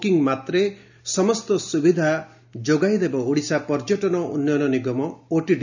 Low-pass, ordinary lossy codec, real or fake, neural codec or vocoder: 7.2 kHz; none; real; none